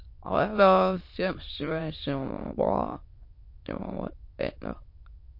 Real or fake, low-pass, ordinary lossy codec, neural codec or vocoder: fake; 5.4 kHz; MP3, 32 kbps; autoencoder, 22.05 kHz, a latent of 192 numbers a frame, VITS, trained on many speakers